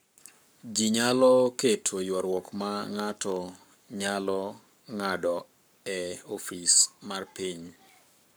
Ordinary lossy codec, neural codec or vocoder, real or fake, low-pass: none; codec, 44.1 kHz, 7.8 kbps, Pupu-Codec; fake; none